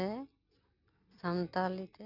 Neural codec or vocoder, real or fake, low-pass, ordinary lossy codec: none; real; 5.4 kHz; none